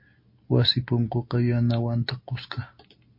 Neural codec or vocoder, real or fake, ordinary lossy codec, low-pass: none; real; MP3, 32 kbps; 5.4 kHz